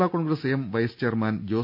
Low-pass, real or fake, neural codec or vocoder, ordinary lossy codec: 5.4 kHz; real; none; none